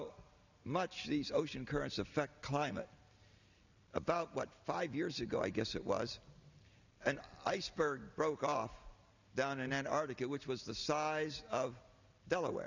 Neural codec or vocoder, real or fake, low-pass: none; real; 7.2 kHz